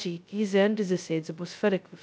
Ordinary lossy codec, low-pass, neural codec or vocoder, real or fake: none; none; codec, 16 kHz, 0.2 kbps, FocalCodec; fake